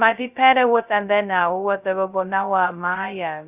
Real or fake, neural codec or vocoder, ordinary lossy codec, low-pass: fake; codec, 16 kHz, 0.2 kbps, FocalCodec; none; 3.6 kHz